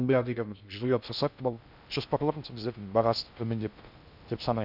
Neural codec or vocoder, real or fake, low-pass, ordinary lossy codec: codec, 16 kHz in and 24 kHz out, 0.6 kbps, FocalCodec, streaming, 2048 codes; fake; 5.4 kHz; none